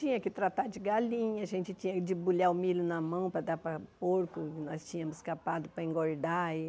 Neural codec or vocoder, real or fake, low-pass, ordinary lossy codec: none; real; none; none